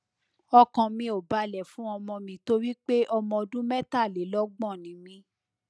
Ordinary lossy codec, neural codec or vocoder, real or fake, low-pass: none; none; real; none